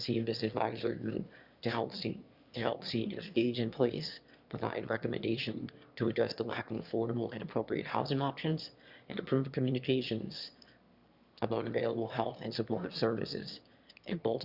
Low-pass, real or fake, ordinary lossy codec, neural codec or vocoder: 5.4 kHz; fake; Opus, 64 kbps; autoencoder, 22.05 kHz, a latent of 192 numbers a frame, VITS, trained on one speaker